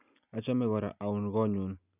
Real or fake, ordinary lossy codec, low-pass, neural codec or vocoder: real; none; 3.6 kHz; none